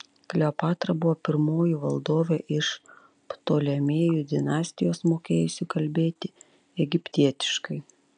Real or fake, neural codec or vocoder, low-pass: real; none; 9.9 kHz